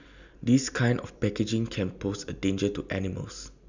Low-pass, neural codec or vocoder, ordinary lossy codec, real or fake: 7.2 kHz; none; none; real